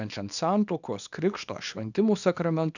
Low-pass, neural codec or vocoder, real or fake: 7.2 kHz; codec, 24 kHz, 0.9 kbps, WavTokenizer, small release; fake